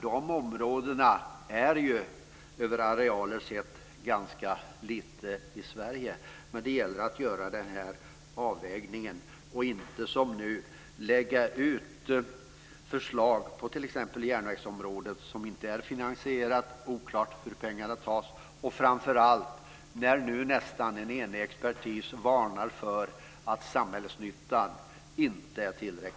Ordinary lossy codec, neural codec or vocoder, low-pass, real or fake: none; none; none; real